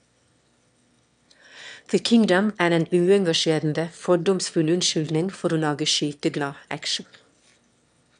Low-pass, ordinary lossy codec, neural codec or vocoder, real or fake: 9.9 kHz; none; autoencoder, 22.05 kHz, a latent of 192 numbers a frame, VITS, trained on one speaker; fake